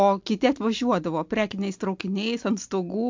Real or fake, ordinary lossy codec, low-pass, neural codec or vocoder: real; MP3, 64 kbps; 7.2 kHz; none